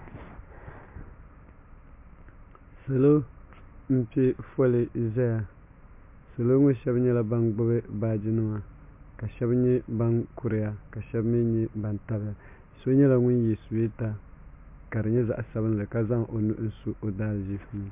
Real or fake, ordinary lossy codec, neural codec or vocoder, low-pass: real; MP3, 32 kbps; none; 3.6 kHz